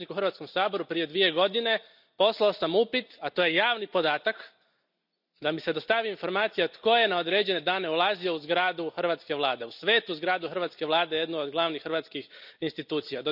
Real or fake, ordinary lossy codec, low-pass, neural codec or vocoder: real; none; 5.4 kHz; none